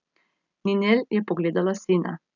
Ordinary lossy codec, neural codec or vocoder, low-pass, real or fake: none; none; 7.2 kHz; real